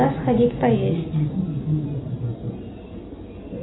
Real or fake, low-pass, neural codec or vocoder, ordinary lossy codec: real; 7.2 kHz; none; AAC, 16 kbps